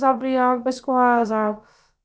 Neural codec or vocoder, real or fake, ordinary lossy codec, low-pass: codec, 16 kHz, about 1 kbps, DyCAST, with the encoder's durations; fake; none; none